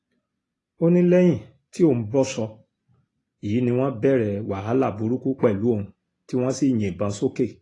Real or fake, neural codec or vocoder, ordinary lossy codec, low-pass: real; none; AAC, 32 kbps; 10.8 kHz